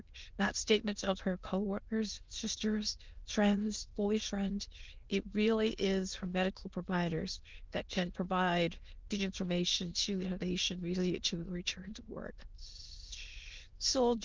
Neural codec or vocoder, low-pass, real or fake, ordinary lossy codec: autoencoder, 22.05 kHz, a latent of 192 numbers a frame, VITS, trained on many speakers; 7.2 kHz; fake; Opus, 32 kbps